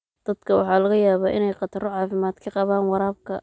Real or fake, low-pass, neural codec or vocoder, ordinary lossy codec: real; none; none; none